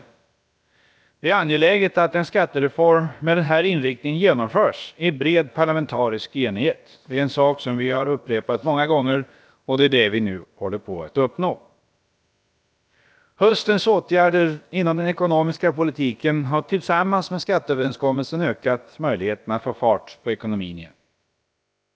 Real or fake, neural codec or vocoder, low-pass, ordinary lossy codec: fake; codec, 16 kHz, about 1 kbps, DyCAST, with the encoder's durations; none; none